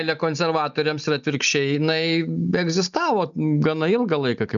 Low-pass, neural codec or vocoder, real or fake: 7.2 kHz; none; real